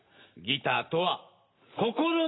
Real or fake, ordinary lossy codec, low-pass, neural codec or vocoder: real; AAC, 16 kbps; 7.2 kHz; none